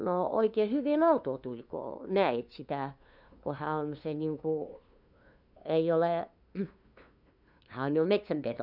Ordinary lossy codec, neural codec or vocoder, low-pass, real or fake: none; codec, 16 kHz, 2 kbps, FunCodec, trained on LibriTTS, 25 frames a second; 5.4 kHz; fake